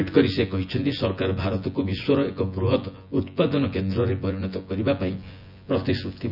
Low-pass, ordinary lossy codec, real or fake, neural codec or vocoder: 5.4 kHz; none; fake; vocoder, 24 kHz, 100 mel bands, Vocos